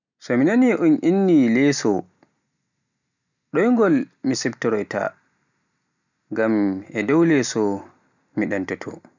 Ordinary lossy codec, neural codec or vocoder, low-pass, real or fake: none; none; 7.2 kHz; real